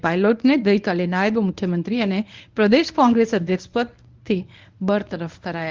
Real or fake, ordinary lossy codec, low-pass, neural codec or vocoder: fake; Opus, 32 kbps; 7.2 kHz; codec, 24 kHz, 0.9 kbps, WavTokenizer, medium speech release version 1